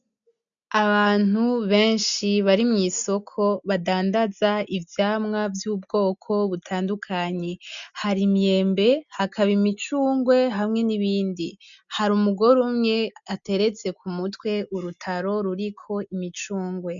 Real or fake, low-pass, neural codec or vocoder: real; 7.2 kHz; none